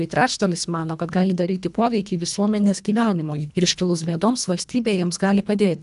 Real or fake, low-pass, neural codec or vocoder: fake; 10.8 kHz; codec, 24 kHz, 1.5 kbps, HILCodec